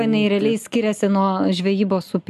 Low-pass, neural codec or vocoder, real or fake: 14.4 kHz; none; real